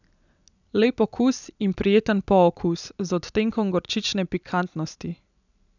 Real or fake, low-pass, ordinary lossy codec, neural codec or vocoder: real; 7.2 kHz; none; none